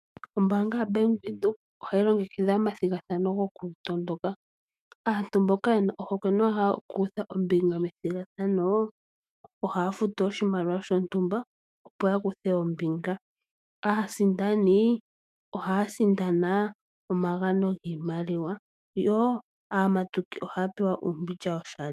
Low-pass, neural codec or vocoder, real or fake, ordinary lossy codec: 14.4 kHz; autoencoder, 48 kHz, 128 numbers a frame, DAC-VAE, trained on Japanese speech; fake; MP3, 96 kbps